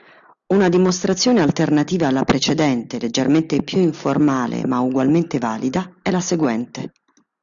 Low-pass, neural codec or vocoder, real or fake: 7.2 kHz; none; real